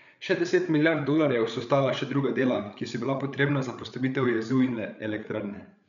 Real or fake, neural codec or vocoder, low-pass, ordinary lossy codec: fake; codec, 16 kHz, 8 kbps, FreqCodec, larger model; 7.2 kHz; none